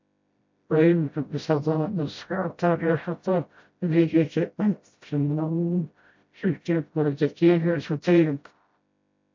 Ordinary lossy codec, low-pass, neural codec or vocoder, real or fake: MP3, 48 kbps; 7.2 kHz; codec, 16 kHz, 0.5 kbps, FreqCodec, smaller model; fake